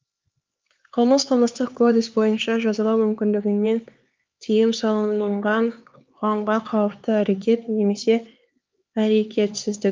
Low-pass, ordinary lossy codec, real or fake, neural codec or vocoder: 7.2 kHz; Opus, 24 kbps; fake; codec, 16 kHz, 4 kbps, X-Codec, HuBERT features, trained on LibriSpeech